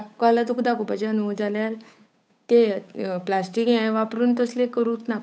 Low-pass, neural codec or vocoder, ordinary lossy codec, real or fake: none; codec, 16 kHz, 8 kbps, FunCodec, trained on Chinese and English, 25 frames a second; none; fake